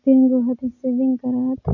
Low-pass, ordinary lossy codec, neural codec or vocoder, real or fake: 7.2 kHz; none; none; real